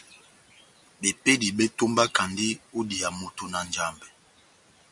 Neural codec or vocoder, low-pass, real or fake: none; 10.8 kHz; real